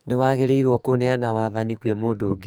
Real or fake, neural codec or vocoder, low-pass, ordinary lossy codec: fake; codec, 44.1 kHz, 2.6 kbps, SNAC; none; none